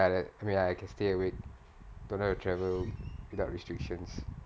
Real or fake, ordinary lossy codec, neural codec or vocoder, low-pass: real; none; none; none